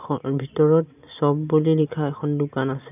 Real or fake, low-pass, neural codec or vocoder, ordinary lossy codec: real; 3.6 kHz; none; none